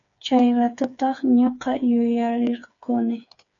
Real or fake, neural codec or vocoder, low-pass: fake; codec, 16 kHz, 4 kbps, FreqCodec, smaller model; 7.2 kHz